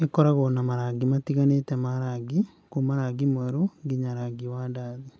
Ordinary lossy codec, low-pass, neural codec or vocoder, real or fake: none; none; none; real